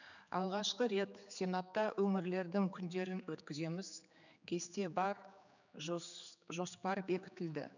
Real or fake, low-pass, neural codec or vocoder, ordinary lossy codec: fake; 7.2 kHz; codec, 16 kHz, 4 kbps, X-Codec, HuBERT features, trained on general audio; none